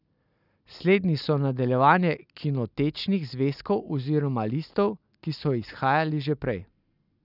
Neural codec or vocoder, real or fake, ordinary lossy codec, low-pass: none; real; none; 5.4 kHz